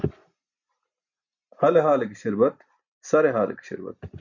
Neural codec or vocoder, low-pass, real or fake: none; 7.2 kHz; real